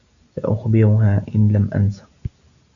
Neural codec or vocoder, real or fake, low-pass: none; real; 7.2 kHz